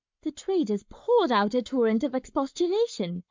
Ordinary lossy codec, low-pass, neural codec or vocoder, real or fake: MP3, 64 kbps; 7.2 kHz; codec, 44.1 kHz, 7.8 kbps, Pupu-Codec; fake